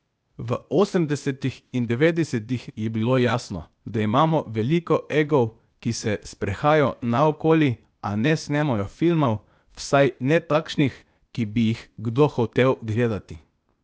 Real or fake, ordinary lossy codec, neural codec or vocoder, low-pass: fake; none; codec, 16 kHz, 0.8 kbps, ZipCodec; none